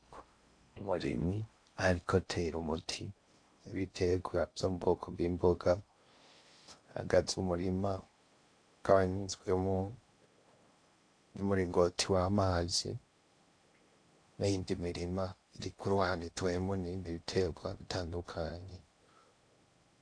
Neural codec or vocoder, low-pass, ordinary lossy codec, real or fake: codec, 16 kHz in and 24 kHz out, 0.6 kbps, FocalCodec, streaming, 4096 codes; 9.9 kHz; AAC, 48 kbps; fake